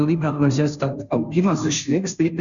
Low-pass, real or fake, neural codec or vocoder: 7.2 kHz; fake; codec, 16 kHz, 0.5 kbps, FunCodec, trained on Chinese and English, 25 frames a second